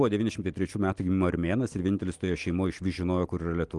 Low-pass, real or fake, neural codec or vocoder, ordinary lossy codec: 10.8 kHz; real; none; Opus, 24 kbps